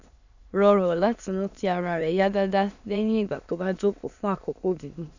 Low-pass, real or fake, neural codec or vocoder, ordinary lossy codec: 7.2 kHz; fake; autoencoder, 22.05 kHz, a latent of 192 numbers a frame, VITS, trained on many speakers; none